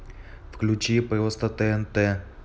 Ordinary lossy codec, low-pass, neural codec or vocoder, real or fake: none; none; none; real